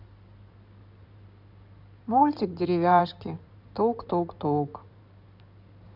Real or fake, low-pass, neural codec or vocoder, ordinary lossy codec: fake; 5.4 kHz; codec, 16 kHz in and 24 kHz out, 2.2 kbps, FireRedTTS-2 codec; none